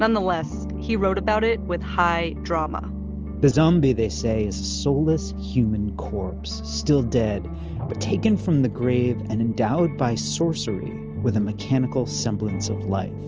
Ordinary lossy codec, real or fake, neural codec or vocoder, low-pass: Opus, 24 kbps; real; none; 7.2 kHz